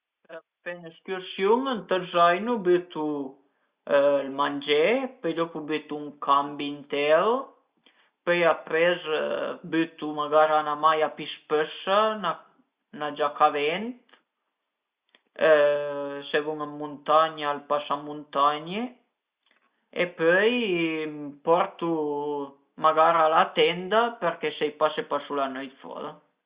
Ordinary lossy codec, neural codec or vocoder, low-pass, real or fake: Opus, 64 kbps; none; 3.6 kHz; real